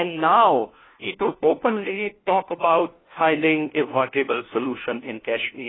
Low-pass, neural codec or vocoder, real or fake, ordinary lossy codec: 7.2 kHz; codec, 16 kHz, 1 kbps, FunCodec, trained on LibriTTS, 50 frames a second; fake; AAC, 16 kbps